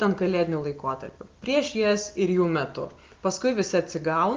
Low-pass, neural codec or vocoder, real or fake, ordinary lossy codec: 7.2 kHz; none; real; Opus, 24 kbps